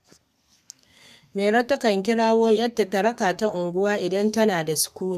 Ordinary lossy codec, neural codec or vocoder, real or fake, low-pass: none; codec, 32 kHz, 1.9 kbps, SNAC; fake; 14.4 kHz